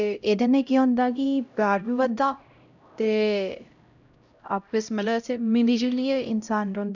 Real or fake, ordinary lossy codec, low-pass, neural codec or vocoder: fake; none; 7.2 kHz; codec, 16 kHz, 0.5 kbps, X-Codec, HuBERT features, trained on LibriSpeech